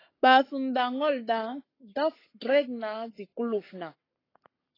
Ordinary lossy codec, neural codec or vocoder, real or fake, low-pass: AAC, 24 kbps; codec, 44.1 kHz, 7.8 kbps, Pupu-Codec; fake; 5.4 kHz